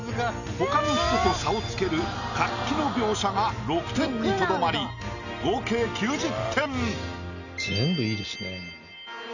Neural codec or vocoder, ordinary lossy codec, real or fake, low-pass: none; none; real; 7.2 kHz